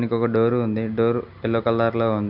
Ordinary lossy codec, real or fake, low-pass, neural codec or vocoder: none; real; 5.4 kHz; none